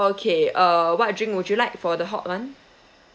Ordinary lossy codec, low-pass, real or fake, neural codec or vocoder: none; none; real; none